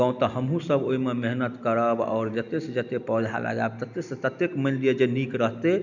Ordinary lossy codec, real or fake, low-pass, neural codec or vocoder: none; real; 7.2 kHz; none